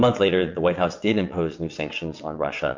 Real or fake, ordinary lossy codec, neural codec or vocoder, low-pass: fake; MP3, 48 kbps; vocoder, 22.05 kHz, 80 mel bands, WaveNeXt; 7.2 kHz